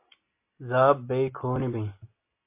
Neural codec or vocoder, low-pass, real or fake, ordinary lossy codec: none; 3.6 kHz; real; MP3, 24 kbps